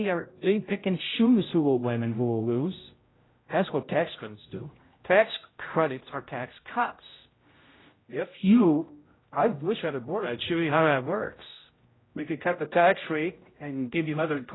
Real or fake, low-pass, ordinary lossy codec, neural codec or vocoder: fake; 7.2 kHz; AAC, 16 kbps; codec, 16 kHz, 0.5 kbps, X-Codec, HuBERT features, trained on general audio